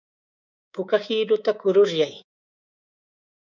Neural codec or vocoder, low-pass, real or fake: codec, 24 kHz, 3.1 kbps, DualCodec; 7.2 kHz; fake